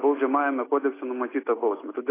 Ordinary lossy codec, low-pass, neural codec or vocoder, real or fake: AAC, 16 kbps; 3.6 kHz; none; real